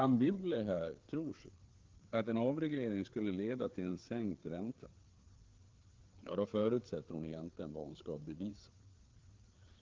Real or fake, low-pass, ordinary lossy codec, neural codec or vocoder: fake; 7.2 kHz; Opus, 16 kbps; codec, 16 kHz, 4 kbps, FreqCodec, larger model